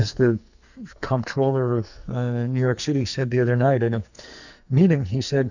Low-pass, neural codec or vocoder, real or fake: 7.2 kHz; codec, 44.1 kHz, 2.6 kbps, SNAC; fake